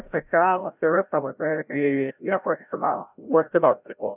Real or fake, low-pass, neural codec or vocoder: fake; 3.6 kHz; codec, 16 kHz, 0.5 kbps, FreqCodec, larger model